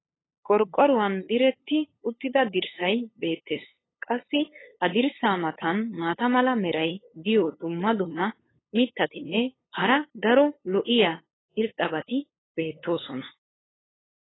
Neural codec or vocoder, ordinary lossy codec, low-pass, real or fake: codec, 16 kHz, 8 kbps, FunCodec, trained on LibriTTS, 25 frames a second; AAC, 16 kbps; 7.2 kHz; fake